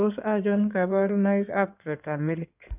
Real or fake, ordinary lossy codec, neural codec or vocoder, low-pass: fake; none; codec, 16 kHz in and 24 kHz out, 2.2 kbps, FireRedTTS-2 codec; 3.6 kHz